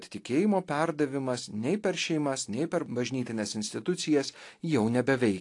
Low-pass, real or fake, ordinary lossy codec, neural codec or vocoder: 10.8 kHz; real; AAC, 48 kbps; none